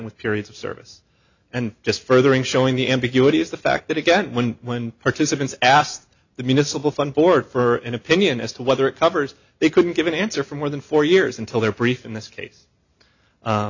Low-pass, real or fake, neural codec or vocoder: 7.2 kHz; real; none